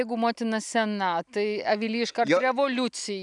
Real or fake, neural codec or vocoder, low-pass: real; none; 10.8 kHz